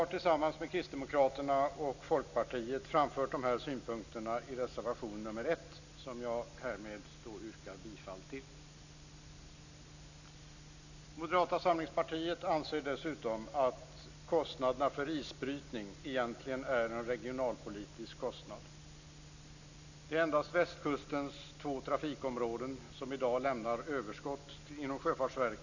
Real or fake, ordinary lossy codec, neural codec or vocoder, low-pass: real; none; none; 7.2 kHz